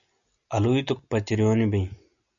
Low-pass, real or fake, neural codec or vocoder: 7.2 kHz; real; none